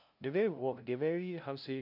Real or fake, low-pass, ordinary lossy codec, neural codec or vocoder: fake; 5.4 kHz; none; codec, 16 kHz, 0.5 kbps, FunCodec, trained on LibriTTS, 25 frames a second